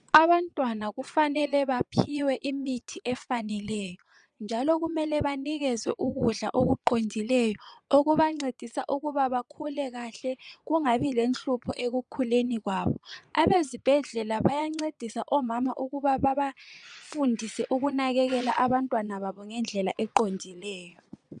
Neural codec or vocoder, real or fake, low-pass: vocoder, 22.05 kHz, 80 mel bands, Vocos; fake; 9.9 kHz